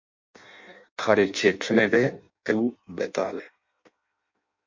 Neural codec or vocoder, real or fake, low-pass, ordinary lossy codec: codec, 16 kHz in and 24 kHz out, 0.6 kbps, FireRedTTS-2 codec; fake; 7.2 kHz; MP3, 48 kbps